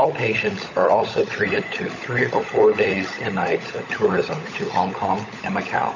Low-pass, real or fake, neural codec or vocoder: 7.2 kHz; fake; codec, 16 kHz, 16 kbps, FunCodec, trained on LibriTTS, 50 frames a second